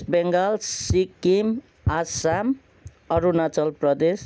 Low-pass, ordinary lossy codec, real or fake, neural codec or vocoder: none; none; real; none